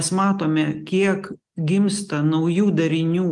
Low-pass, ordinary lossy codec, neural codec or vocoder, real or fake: 10.8 kHz; Opus, 24 kbps; none; real